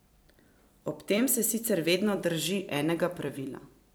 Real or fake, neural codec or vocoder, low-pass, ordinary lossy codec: fake; vocoder, 44.1 kHz, 128 mel bands every 256 samples, BigVGAN v2; none; none